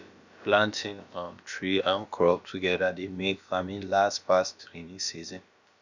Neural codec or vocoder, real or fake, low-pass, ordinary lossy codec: codec, 16 kHz, about 1 kbps, DyCAST, with the encoder's durations; fake; 7.2 kHz; none